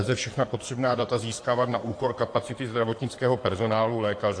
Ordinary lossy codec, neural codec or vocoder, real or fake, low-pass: AAC, 48 kbps; codec, 16 kHz in and 24 kHz out, 2.2 kbps, FireRedTTS-2 codec; fake; 9.9 kHz